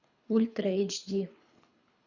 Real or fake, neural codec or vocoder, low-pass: fake; codec, 24 kHz, 3 kbps, HILCodec; 7.2 kHz